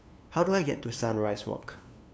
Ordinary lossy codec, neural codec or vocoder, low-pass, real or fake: none; codec, 16 kHz, 2 kbps, FunCodec, trained on LibriTTS, 25 frames a second; none; fake